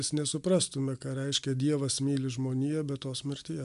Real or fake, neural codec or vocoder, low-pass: real; none; 10.8 kHz